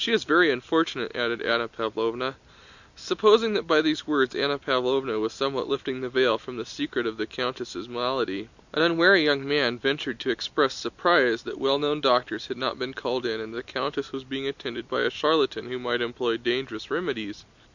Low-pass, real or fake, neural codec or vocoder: 7.2 kHz; real; none